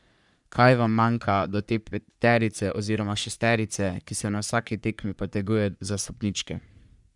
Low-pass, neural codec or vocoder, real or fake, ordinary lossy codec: 10.8 kHz; codec, 44.1 kHz, 3.4 kbps, Pupu-Codec; fake; none